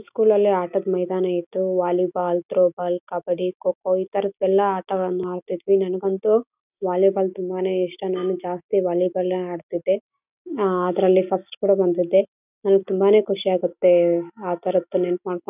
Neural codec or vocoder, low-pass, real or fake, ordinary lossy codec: none; 3.6 kHz; real; none